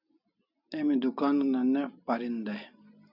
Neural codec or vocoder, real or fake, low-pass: none; real; 5.4 kHz